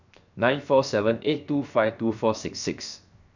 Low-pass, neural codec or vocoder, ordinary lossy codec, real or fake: 7.2 kHz; codec, 16 kHz, 0.7 kbps, FocalCodec; none; fake